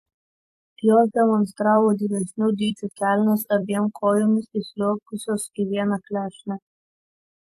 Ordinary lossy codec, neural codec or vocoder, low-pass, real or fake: AAC, 64 kbps; vocoder, 44.1 kHz, 128 mel bands every 256 samples, BigVGAN v2; 14.4 kHz; fake